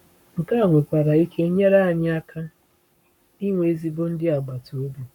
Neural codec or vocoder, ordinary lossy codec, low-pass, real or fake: codec, 44.1 kHz, 7.8 kbps, Pupu-Codec; none; 19.8 kHz; fake